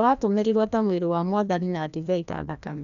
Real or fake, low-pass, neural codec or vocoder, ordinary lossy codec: fake; 7.2 kHz; codec, 16 kHz, 1 kbps, FreqCodec, larger model; none